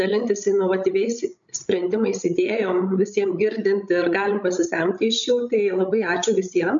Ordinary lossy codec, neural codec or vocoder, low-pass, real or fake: MP3, 64 kbps; codec, 16 kHz, 16 kbps, FreqCodec, larger model; 7.2 kHz; fake